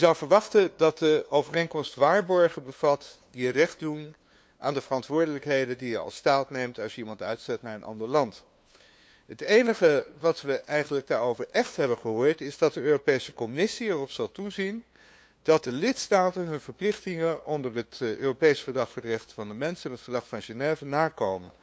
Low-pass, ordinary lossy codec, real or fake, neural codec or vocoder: none; none; fake; codec, 16 kHz, 2 kbps, FunCodec, trained on LibriTTS, 25 frames a second